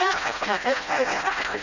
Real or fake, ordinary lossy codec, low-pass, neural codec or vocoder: fake; none; 7.2 kHz; codec, 16 kHz, 0.5 kbps, FreqCodec, smaller model